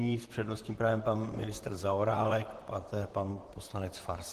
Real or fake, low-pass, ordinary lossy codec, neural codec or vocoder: fake; 14.4 kHz; Opus, 16 kbps; vocoder, 44.1 kHz, 128 mel bands, Pupu-Vocoder